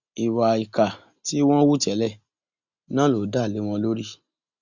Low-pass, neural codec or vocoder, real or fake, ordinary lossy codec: 7.2 kHz; none; real; Opus, 64 kbps